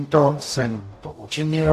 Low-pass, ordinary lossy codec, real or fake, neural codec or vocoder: 14.4 kHz; AAC, 64 kbps; fake; codec, 44.1 kHz, 0.9 kbps, DAC